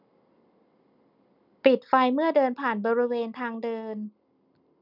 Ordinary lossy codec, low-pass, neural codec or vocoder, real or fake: none; 5.4 kHz; none; real